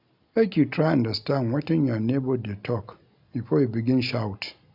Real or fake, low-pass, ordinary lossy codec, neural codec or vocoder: real; 5.4 kHz; none; none